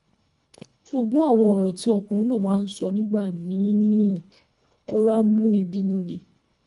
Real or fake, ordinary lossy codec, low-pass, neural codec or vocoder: fake; none; 10.8 kHz; codec, 24 kHz, 1.5 kbps, HILCodec